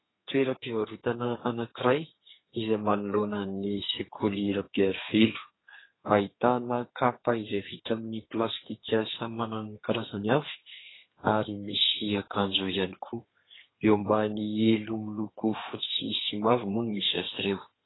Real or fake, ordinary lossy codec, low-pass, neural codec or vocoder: fake; AAC, 16 kbps; 7.2 kHz; codec, 32 kHz, 1.9 kbps, SNAC